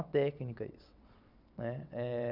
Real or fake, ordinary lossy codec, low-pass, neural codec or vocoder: fake; none; 5.4 kHz; vocoder, 22.05 kHz, 80 mel bands, WaveNeXt